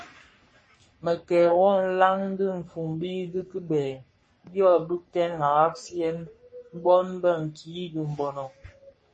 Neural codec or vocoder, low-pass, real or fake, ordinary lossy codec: codec, 44.1 kHz, 3.4 kbps, Pupu-Codec; 10.8 kHz; fake; MP3, 32 kbps